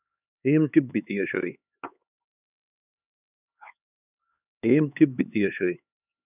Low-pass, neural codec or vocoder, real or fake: 3.6 kHz; codec, 16 kHz, 4 kbps, X-Codec, HuBERT features, trained on LibriSpeech; fake